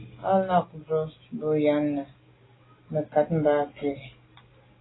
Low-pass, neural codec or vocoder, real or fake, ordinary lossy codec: 7.2 kHz; none; real; AAC, 16 kbps